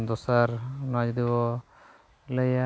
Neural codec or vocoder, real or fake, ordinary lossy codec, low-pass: none; real; none; none